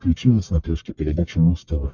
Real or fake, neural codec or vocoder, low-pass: fake; codec, 44.1 kHz, 1.7 kbps, Pupu-Codec; 7.2 kHz